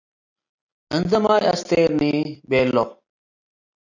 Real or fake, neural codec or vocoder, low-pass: real; none; 7.2 kHz